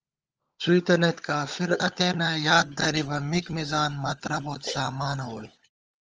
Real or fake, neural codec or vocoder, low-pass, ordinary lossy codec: fake; codec, 16 kHz, 16 kbps, FunCodec, trained on LibriTTS, 50 frames a second; 7.2 kHz; Opus, 24 kbps